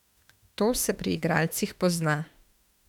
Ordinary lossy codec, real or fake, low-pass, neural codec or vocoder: none; fake; 19.8 kHz; autoencoder, 48 kHz, 32 numbers a frame, DAC-VAE, trained on Japanese speech